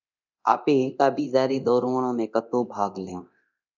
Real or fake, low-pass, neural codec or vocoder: fake; 7.2 kHz; codec, 24 kHz, 0.9 kbps, DualCodec